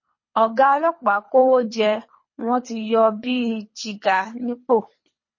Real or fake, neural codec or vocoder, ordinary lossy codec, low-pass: fake; codec, 24 kHz, 3 kbps, HILCodec; MP3, 32 kbps; 7.2 kHz